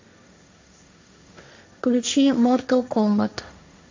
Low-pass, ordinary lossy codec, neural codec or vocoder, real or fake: 7.2 kHz; MP3, 64 kbps; codec, 16 kHz, 1.1 kbps, Voila-Tokenizer; fake